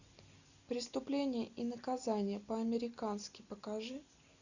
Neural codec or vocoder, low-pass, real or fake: none; 7.2 kHz; real